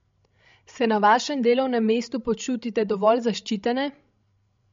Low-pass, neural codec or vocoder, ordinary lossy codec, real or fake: 7.2 kHz; codec, 16 kHz, 16 kbps, FreqCodec, larger model; MP3, 48 kbps; fake